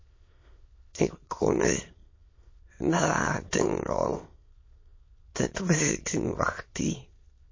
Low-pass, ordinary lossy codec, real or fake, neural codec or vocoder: 7.2 kHz; MP3, 32 kbps; fake; autoencoder, 22.05 kHz, a latent of 192 numbers a frame, VITS, trained on many speakers